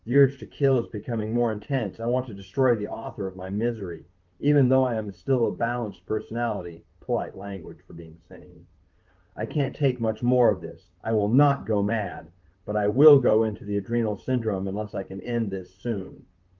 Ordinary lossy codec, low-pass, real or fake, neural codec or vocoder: Opus, 32 kbps; 7.2 kHz; fake; vocoder, 44.1 kHz, 128 mel bands, Pupu-Vocoder